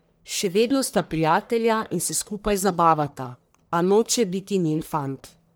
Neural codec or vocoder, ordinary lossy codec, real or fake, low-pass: codec, 44.1 kHz, 1.7 kbps, Pupu-Codec; none; fake; none